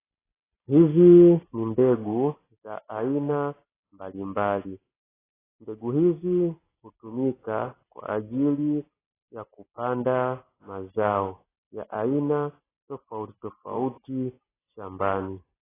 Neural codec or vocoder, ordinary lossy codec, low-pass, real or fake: none; AAC, 16 kbps; 3.6 kHz; real